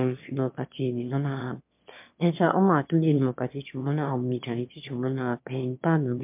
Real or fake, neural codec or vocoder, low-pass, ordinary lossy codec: fake; autoencoder, 22.05 kHz, a latent of 192 numbers a frame, VITS, trained on one speaker; 3.6 kHz; MP3, 32 kbps